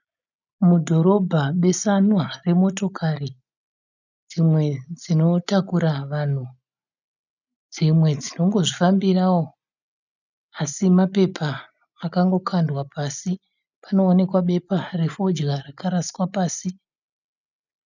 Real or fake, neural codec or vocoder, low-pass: real; none; 7.2 kHz